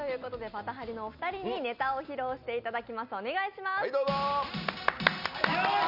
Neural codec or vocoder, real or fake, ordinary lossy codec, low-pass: none; real; none; 5.4 kHz